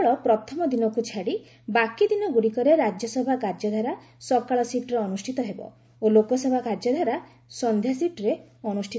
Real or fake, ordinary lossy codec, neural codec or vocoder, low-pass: real; none; none; none